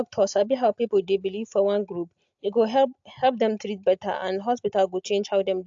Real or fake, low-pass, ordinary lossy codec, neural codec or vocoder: real; 7.2 kHz; AAC, 64 kbps; none